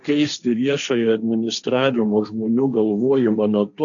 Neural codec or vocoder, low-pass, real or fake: codec, 16 kHz, 1.1 kbps, Voila-Tokenizer; 7.2 kHz; fake